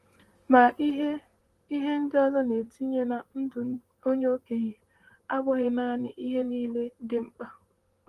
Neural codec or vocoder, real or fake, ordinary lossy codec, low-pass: vocoder, 44.1 kHz, 128 mel bands, Pupu-Vocoder; fake; Opus, 24 kbps; 14.4 kHz